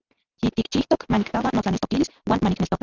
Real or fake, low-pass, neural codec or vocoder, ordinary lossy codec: real; 7.2 kHz; none; Opus, 32 kbps